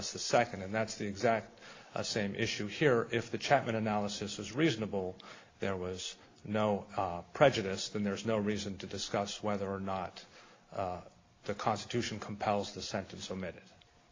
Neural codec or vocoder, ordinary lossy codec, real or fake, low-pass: none; AAC, 32 kbps; real; 7.2 kHz